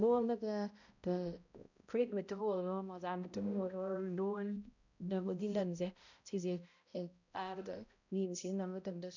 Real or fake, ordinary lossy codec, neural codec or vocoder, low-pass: fake; none; codec, 16 kHz, 0.5 kbps, X-Codec, HuBERT features, trained on balanced general audio; 7.2 kHz